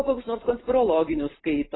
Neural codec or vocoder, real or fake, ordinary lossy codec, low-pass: none; real; AAC, 16 kbps; 7.2 kHz